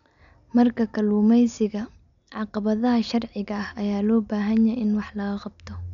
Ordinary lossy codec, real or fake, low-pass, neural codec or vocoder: none; real; 7.2 kHz; none